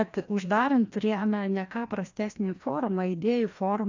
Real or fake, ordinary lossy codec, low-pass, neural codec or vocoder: fake; AAC, 48 kbps; 7.2 kHz; codec, 16 kHz, 1 kbps, FreqCodec, larger model